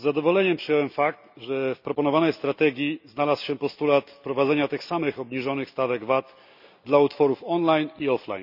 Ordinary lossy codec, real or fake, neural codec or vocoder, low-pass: none; real; none; 5.4 kHz